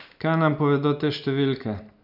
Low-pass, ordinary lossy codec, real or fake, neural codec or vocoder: 5.4 kHz; none; real; none